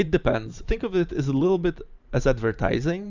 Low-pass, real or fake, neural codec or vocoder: 7.2 kHz; fake; vocoder, 44.1 kHz, 128 mel bands every 512 samples, BigVGAN v2